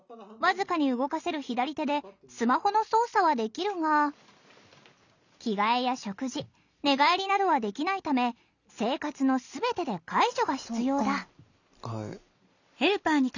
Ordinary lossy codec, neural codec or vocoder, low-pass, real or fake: none; none; 7.2 kHz; real